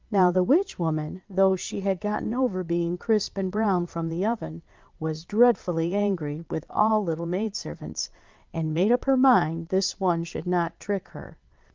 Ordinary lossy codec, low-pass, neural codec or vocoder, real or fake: Opus, 24 kbps; 7.2 kHz; vocoder, 22.05 kHz, 80 mel bands, Vocos; fake